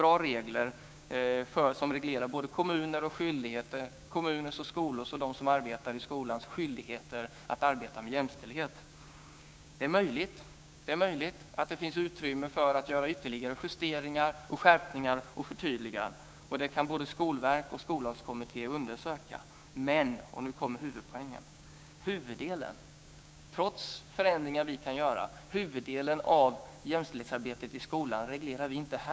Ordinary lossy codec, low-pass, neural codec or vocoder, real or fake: none; none; codec, 16 kHz, 6 kbps, DAC; fake